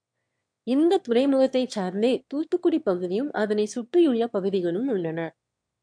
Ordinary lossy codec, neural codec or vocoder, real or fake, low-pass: MP3, 64 kbps; autoencoder, 22.05 kHz, a latent of 192 numbers a frame, VITS, trained on one speaker; fake; 9.9 kHz